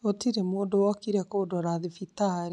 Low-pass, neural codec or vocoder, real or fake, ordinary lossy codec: 10.8 kHz; none; real; none